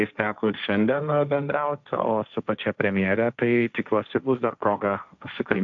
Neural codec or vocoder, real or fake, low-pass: codec, 16 kHz, 1.1 kbps, Voila-Tokenizer; fake; 7.2 kHz